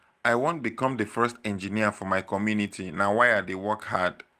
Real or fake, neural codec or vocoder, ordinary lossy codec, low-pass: real; none; Opus, 24 kbps; 14.4 kHz